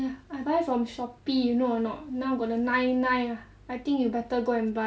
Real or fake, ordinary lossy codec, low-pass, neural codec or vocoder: real; none; none; none